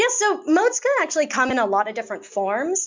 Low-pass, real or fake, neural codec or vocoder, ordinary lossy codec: 7.2 kHz; real; none; MP3, 64 kbps